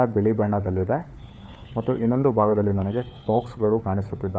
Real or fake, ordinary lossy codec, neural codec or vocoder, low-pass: fake; none; codec, 16 kHz, 4 kbps, FunCodec, trained on LibriTTS, 50 frames a second; none